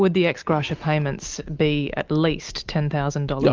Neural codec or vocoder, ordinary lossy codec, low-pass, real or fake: none; Opus, 24 kbps; 7.2 kHz; real